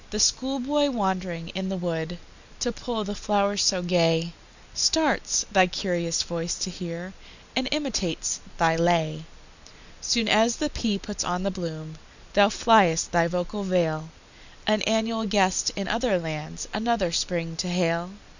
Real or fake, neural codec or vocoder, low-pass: real; none; 7.2 kHz